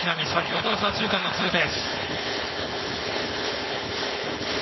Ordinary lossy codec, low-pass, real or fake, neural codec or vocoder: MP3, 24 kbps; 7.2 kHz; fake; codec, 16 kHz, 4.8 kbps, FACodec